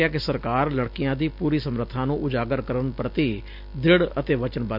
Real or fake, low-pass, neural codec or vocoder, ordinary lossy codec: real; 5.4 kHz; none; none